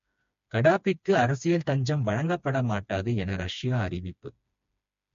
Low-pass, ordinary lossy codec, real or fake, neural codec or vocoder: 7.2 kHz; MP3, 48 kbps; fake; codec, 16 kHz, 2 kbps, FreqCodec, smaller model